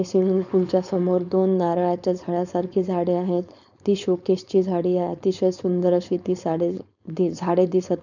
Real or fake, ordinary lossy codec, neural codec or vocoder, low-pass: fake; none; codec, 16 kHz, 4.8 kbps, FACodec; 7.2 kHz